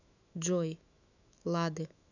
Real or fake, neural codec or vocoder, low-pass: fake; autoencoder, 48 kHz, 128 numbers a frame, DAC-VAE, trained on Japanese speech; 7.2 kHz